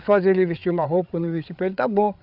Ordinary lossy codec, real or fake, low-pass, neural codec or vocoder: none; fake; 5.4 kHz; codec, 16 kHz, 8 kbps, FreqCodec, larger model